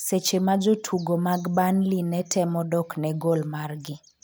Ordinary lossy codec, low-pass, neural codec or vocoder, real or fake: none; none; none; real